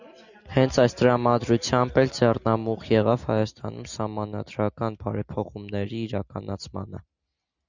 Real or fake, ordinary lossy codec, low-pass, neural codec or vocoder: real; Opus, 64 kbps; 7.2 kHz; none